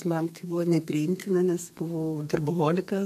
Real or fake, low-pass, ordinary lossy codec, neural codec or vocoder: fake; 14.4 kHz; MP3, 64 kbps; codec, 32 kHz, 1.9 kbps, SNAC